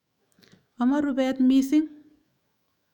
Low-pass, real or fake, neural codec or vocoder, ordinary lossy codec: 19.8 kHz; fake; autoencoder, 48 kHz, 128 numbers a frame, DAC-VAE, trained on Japanese speech; none